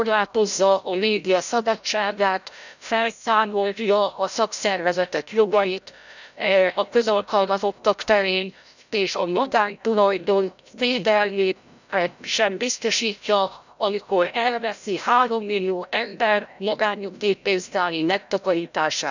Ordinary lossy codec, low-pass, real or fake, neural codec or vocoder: none; 7.2 kHz; fake; codec, 16 kHz, 0.5 kbps, FreqCodec, larger model